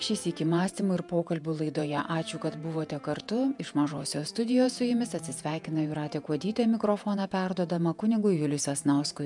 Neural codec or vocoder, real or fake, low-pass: vocoder, 48 kHz, 128 mel bands, Vocos; fake; 10.8 kHz